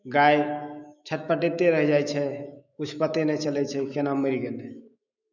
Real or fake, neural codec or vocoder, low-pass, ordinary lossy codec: fake; autoencoder, 48 kHz, 128 numbers a frame, DAC-VAE, trained on Japanese speech; 7.2 kHz; none